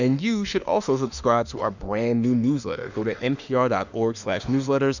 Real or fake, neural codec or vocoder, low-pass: fake; autoencoder, 48 kHz, 32 numbers a frame, DAC-VAE, trained on Japanese speech; 7.2 kHz